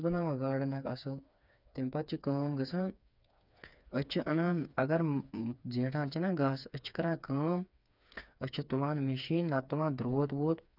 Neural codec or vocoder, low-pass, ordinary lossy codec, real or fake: codec, 16 kHz, 4 kbps, FreqCodec, smaller model; 5.4 kHz; none; fake